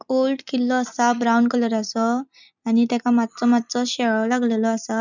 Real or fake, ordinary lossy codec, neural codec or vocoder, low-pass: real; none; none; 7.2 kHz